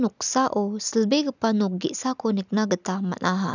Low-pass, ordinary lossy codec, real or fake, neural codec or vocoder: 7.2 kHz; none; real; none